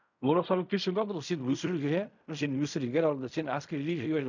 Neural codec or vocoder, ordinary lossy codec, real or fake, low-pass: codec, 16 kHz in and 24 kHz out, 0.4 kbps, LongCat-Audio-Codec, fine tuned four codebook decoder; none; fake; 7.2 kHz